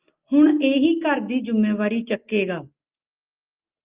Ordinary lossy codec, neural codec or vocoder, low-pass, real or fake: Opus, 24 kbps; none; 3.6 kHz; real